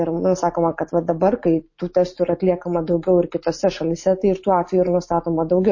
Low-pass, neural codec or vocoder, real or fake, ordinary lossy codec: 7.2 kHz; none; real; MP3, 32 kbps